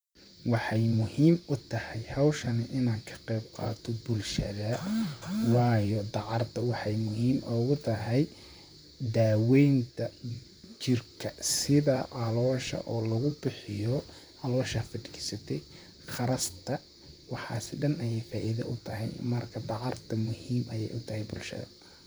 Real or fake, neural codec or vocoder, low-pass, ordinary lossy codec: fake; vocoder, 44.1 kHz, 128 mel bands, Pupu-Vocoder; none; none